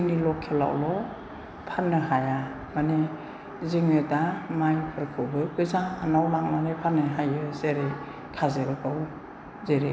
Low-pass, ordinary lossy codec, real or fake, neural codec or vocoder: none; none; real; none